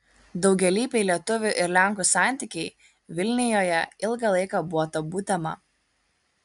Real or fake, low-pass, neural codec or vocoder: real; 10.8 kHz; none